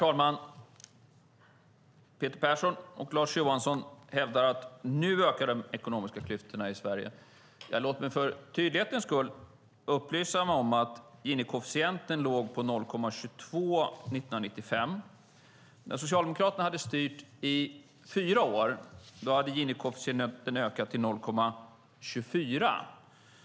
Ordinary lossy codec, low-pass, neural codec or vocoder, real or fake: none; none; none; real